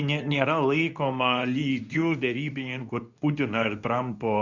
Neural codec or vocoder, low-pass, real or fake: codec, 24 kHz, 0.9 kbps, WavTokenizer, medium speech release version 2; 7.2 kHz; fake